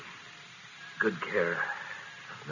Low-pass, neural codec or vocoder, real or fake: 7.2 kHz; none; real